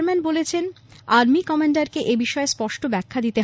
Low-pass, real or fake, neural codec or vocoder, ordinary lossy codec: none; real; none; none